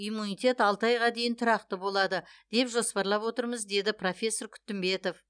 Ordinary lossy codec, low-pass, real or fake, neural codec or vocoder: none; 9.9 kHz; real; none